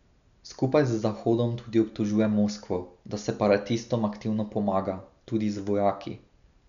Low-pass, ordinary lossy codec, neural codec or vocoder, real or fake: 7.2 kHz; none; none; real